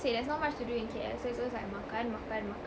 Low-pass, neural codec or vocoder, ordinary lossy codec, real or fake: none; none; none; real